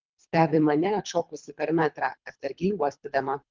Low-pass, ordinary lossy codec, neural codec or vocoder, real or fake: 7.2 kHz; Opus, 32 kbps; codec, 24 kHz, 3 kbps, HILCodec; fake